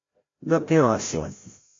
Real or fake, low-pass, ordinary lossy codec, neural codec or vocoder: fake; 7.2 kHz; AAC, 32 kbps; codec, 16 kHz, 0.5 kbps, FreqCodec, larger model